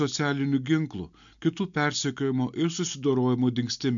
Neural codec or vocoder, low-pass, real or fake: none; 7.2 kHz; real